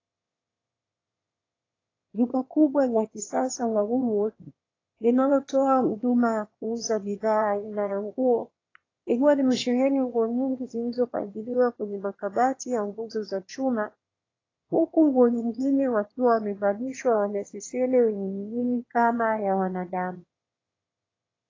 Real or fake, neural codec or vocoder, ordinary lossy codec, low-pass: fake; autoencoder, 22.05 kHz, a latent of 192 numbers a frame, VITS, trained on one speaker; AAC, 32 kbps; 7.2 kHz